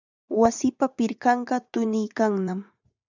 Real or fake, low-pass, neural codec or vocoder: fake; 7.2 kHz; vocoder, 44.1 kHz, 80 mel bands, Vocos